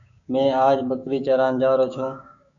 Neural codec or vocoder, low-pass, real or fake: codec, 16 kHz, 6 kbps, DAC; 7.2 kHz; fake